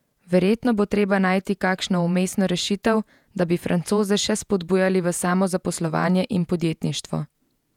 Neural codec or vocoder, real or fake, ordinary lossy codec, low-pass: vocoder, 48 kHz, 128 mel bands, Vocos; fake; none; 19.8 kHz